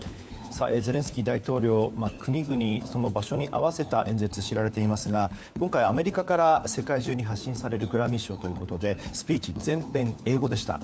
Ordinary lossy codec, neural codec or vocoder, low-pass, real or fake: none; codec, 16 kHz, 4 kbps, FunCodec, trained on LibriTTS, 50 frames a second; none; fake